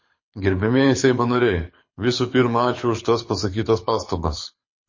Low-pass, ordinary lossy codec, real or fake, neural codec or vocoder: 7.2 kHz; MP3, 32 kbps; fake; codec, 24 kHz, 6 kbps, HILCodec